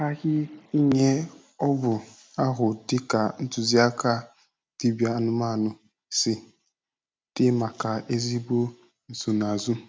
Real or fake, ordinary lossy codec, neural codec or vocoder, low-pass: real; none; none; none